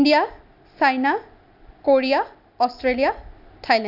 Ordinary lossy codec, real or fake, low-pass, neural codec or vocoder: none; real; 5.4 kHz; none